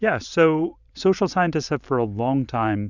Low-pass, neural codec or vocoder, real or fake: 7.2 kHz; vocoder, 44.1 kHz, 128 mel bands every 512 samples, BigVGAN v2; fake